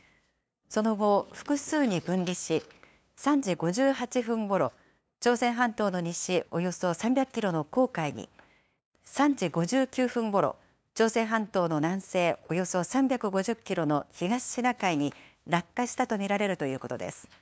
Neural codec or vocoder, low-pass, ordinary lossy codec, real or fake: codec, 16 kHz, 2 kbps, FunCodec, trained on LibriTTS, 25 frames a second; none; none; fake